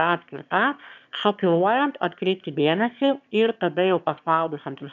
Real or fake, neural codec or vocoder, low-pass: fake; autoencoder, 22.05 kHz, a latent of 192 numbers a frame, VITS, trained on one speaker; 7.2 kHz